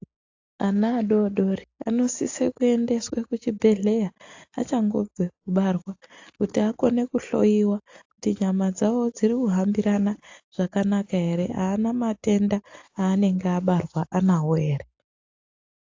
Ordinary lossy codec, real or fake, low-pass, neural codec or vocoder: AAC, 48 kbps; real; 7.2 kHz; none